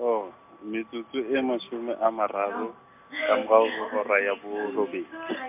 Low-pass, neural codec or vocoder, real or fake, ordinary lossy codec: 3.6 kHz; none; real; MP3, 32 kbps